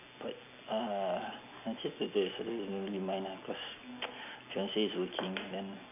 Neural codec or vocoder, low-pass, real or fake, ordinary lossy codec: none; 3.6 kHz; real; AAC, 32 kbps